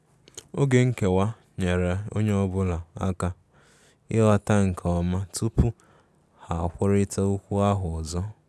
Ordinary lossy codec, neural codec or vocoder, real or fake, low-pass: none; none; real; none